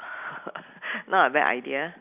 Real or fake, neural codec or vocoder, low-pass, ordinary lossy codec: real; none; 3.6 kHz; none